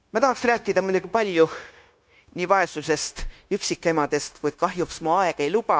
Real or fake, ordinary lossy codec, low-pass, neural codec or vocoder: fake; none; none; codec, 16 kHz, 0.9 kbps, LongCat-Audio-Codec